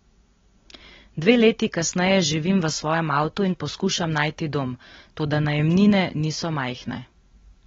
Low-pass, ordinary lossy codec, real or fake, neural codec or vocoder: 7.2 kHz; AAC, 24 kbps; real; none